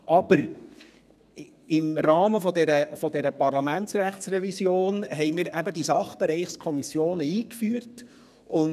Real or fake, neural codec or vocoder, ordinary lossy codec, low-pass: fake; codec, 32 kHz, 1.9 kbps, SNAC; none; 14.4 kHz